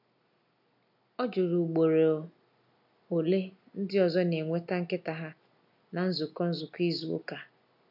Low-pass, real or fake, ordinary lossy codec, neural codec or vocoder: 5.4 kHz; real; none; none